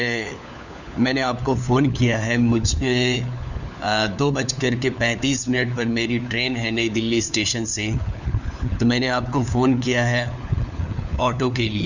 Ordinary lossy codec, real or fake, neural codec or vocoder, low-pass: none; fake; codec, 16 kHz, 4 kbps, FunCodec, trained on LibriTTS, 50 frames a second; 7.2 kHz